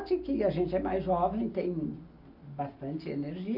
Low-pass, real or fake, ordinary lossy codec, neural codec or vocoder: 5.4 kHz; real; none; none